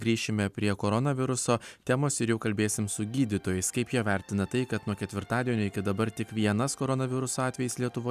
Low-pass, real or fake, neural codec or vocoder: 14.4 kHz; real; none